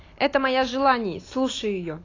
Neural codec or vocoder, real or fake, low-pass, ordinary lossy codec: none; real; 7.2 kHz; AAC, 32 kbps